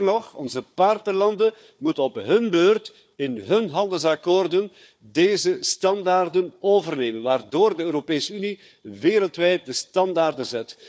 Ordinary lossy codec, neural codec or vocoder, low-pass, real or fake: none; codec, 16 kHz, 4 kbps, FunCodec, trained on Chinese and English, 50 frames a second; none; fake